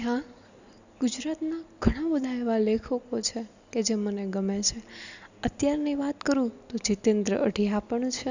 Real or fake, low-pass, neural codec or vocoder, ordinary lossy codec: real; 7.2 kHz; none; none